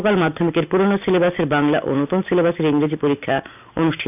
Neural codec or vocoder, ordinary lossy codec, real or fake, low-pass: none; none; real; 3.6 kHz